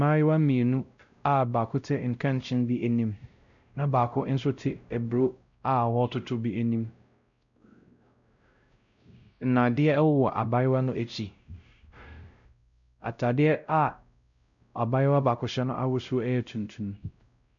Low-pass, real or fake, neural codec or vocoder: 7.2 kHz; fake; codec, 16 kHz, 0.5 kbps, X-Codec, WavLM features, trained on Multilingual LibriSpeech